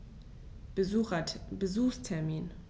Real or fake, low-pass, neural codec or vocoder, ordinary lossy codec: real; none; none; none